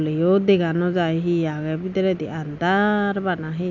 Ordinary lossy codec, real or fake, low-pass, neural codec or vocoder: none; real; 7.2 kHz; none